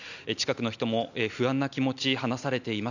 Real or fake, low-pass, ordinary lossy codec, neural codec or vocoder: real; 7.2 kHz; none; none